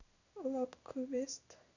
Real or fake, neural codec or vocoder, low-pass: fake; codec, 16 kHz in and 24 kHz out, 1 kbps, XY-Tokenizer; 7.2 kHz